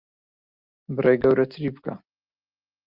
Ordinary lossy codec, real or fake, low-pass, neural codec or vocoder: Opus, 24 kbps; real; 5.4 kHz; none